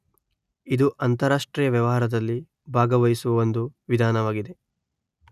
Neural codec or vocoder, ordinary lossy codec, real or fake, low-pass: none; none; real; 14.4 kHz